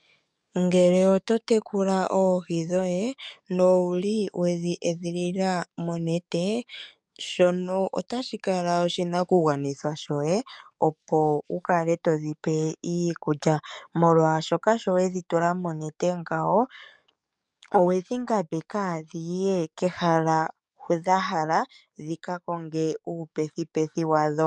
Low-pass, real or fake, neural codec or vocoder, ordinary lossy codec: 10.8 kHz; fake; codec, 44.1 kHz, 7.8 kbps, DAC; MP3, 96 kbps